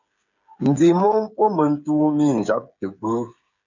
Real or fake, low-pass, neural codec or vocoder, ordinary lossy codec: fake; 7.2 kHz; codec, 16 kHz, 8 kbps, FreqCodec, smaller model; MP3, 64 kbps